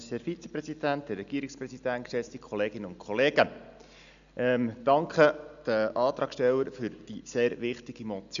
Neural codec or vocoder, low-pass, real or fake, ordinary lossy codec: none; 7.2 kHz; real; none